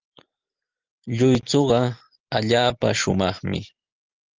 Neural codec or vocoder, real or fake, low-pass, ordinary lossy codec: none; real; 7.2 kHz; Opus, 32 kbps